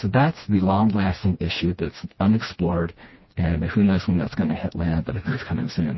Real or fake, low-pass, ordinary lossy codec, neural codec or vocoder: fake; 7.2 kHz; MP3, 24 kbps; codec, 16 kHz, 2 kbps, FreqCodec, smaller model